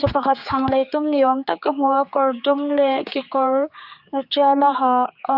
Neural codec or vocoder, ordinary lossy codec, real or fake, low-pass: codec, 16 kHz, 4 kbps, X-Codec, HuBERT features, trained on general audio; Opus, 64 kbps; fake; 5.4 kHz